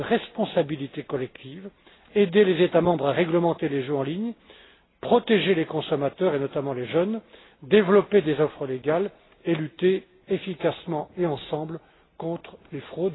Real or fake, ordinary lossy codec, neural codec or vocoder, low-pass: real; AAC, 16 kbps; none; 7.2 kHz